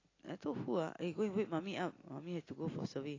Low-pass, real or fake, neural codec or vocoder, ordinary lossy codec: 7.2 kHz; real; none; AAC, 32 kbps